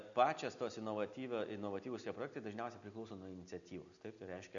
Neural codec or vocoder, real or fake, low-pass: none; real; 7.2 kHz